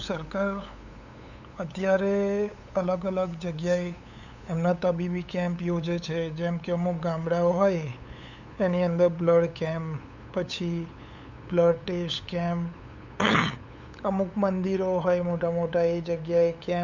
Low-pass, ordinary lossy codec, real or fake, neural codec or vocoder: 7.2 kHz; none; fake; codec, 16 kHz, 8 kbps, FunCodec, trained on LibriTTS, 25 frames a second